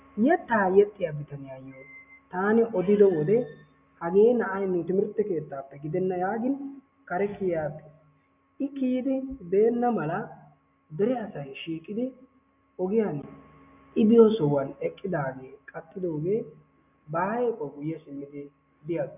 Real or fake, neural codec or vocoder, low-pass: real; none; 3.6 kHz